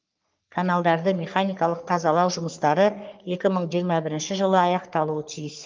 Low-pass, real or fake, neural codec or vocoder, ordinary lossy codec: 7.2 kHz; fake; codec, 44.1 kHz, 3.4 kbps, Pupu-Codec; Opus, 24 kbps